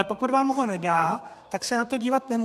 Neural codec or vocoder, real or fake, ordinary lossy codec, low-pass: codec, 32 kHz, 1.9 kbps, SNAC; fake; AAC, 96 kbps; 14.4 kHz